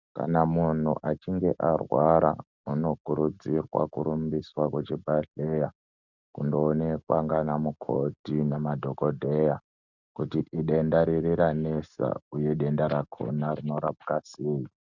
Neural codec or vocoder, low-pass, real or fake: none; 7.2 kHz; real